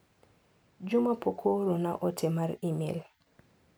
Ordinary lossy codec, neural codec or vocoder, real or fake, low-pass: none; none; real; none